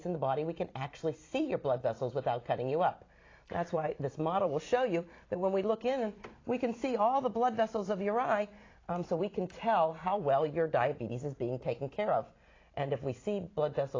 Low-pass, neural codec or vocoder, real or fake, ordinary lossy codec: 7.2 kHz; vocoder, 44.1 kHz, 128 mel bands every 256 samples, BigVGAN v2; fake; AAC, 32 kbps